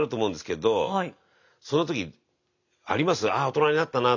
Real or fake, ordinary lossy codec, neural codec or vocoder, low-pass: real; none; none; 7.2 kHz